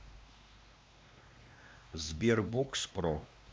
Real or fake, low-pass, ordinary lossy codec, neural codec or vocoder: fake; none; none; codec, 16 kHz, 2 kbps, X-Codec, WavLM features, trained on Multilingual LibriSpeech